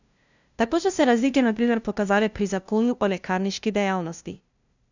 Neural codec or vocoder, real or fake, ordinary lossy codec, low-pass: codec, 16 kHz, 0.5 kbps, FunCodec, trained on LibriTTS, 25 frames a second; fake; none; 7.2 kHz